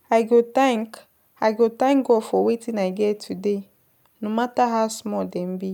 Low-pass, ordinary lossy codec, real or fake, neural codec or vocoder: 19.8 kHz; none; real; none